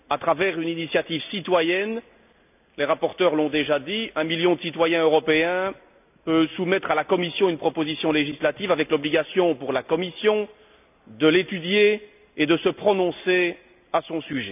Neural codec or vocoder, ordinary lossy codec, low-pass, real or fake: none; none; 3.6 kHz; real